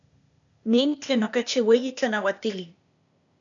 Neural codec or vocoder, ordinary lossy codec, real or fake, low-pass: codec, 16 kHz, 0.8 kbps, ZipCodec; MP3, 96 kbps; fake; 7.2 kHz